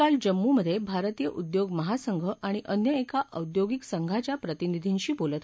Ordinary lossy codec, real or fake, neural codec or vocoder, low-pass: none; real; none; none